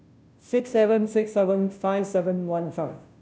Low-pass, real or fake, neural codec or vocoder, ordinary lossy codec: none; fake; codec, 16 kHz, 0.5 kbps, FunCodec, trained on Chinese and English, 25 frames a second; none